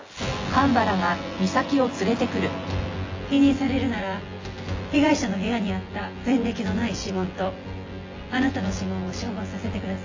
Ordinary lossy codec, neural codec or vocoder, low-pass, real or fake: AAC, 32 kbps; vocoder, 24 kHz, 100 mel bands, Vocos; 7.2 kHz; fake